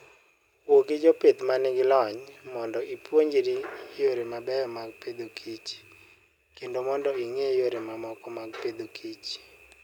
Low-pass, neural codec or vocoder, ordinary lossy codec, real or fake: 19.8 kHz; none; none; real